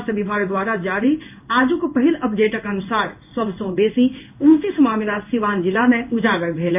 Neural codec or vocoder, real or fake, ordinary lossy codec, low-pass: codec, 16 kHz in and 24 kHz out, 1 kbps, XY-Tokenizer; fake; none; 3.6 kHz